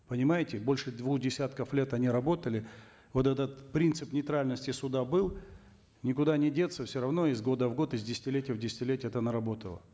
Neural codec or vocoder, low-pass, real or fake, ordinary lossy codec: none; none; real; none